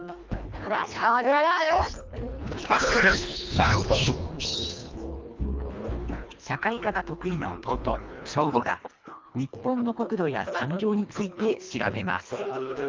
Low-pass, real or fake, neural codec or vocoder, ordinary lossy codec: 7.2 kHz; fake; codec, 24 kHz, 1.5 kbps, HILCodec; Opus, 24 kbps